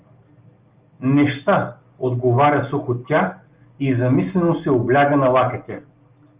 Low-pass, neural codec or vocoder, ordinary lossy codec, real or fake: 3.6 kHz; none; Opus, 32 kbps; real